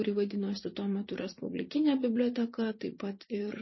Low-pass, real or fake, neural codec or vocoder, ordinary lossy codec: 7.2 kHz; fake; vocoder, 44.1 kHz, 80 mel bands, Vocos; MP3, 24 kbps